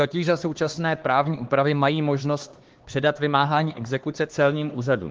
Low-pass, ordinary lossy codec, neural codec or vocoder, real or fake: 7.2 kHz; Opus, 16 kbps; codec, 16 kHz, 2 kbps, X-Codec, HuBERT features, trained on LibriSpeech; fake